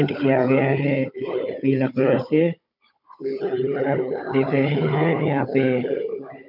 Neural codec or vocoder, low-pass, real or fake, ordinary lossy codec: codec, 16 kHz, 16 kbps, FunCodec, trained on Chinese and English, 50 frames a second; 5.4 kHz; fake; none